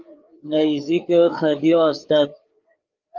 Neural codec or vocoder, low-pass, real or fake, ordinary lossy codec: codec, 16 kHz, 4 kbps, FreqCodec, larger model; 7.2 kHz; fake; Opus, 32 kbps